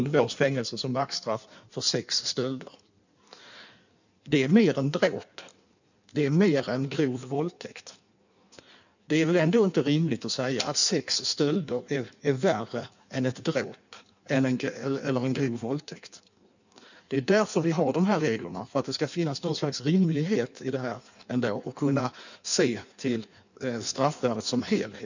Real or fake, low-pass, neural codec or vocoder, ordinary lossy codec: fake; 7.2 kHz; codec, 16 kHz in and 24 kHz out, 1.1 kbps, FireRedTTS-2 codec; none